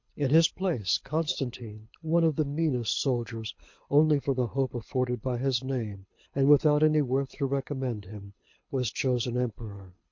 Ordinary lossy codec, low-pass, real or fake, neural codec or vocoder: MP3, 48 kbps; 7.2 kHz; fake; codec, 24 kHz, 6 kbps, HILCodec